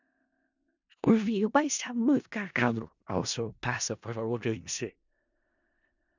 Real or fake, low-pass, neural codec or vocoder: fake; 7.2 kHz; codec, 16 kHz in and 24 kHz out, 0.4 kbps, LongCat-Audio-Codec, four codebook decoder